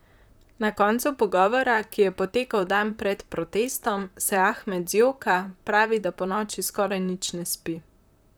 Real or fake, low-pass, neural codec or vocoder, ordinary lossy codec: fake; none; vocoder, 44.1 kHz, 128 mel bands, Pupu-Vocoder; none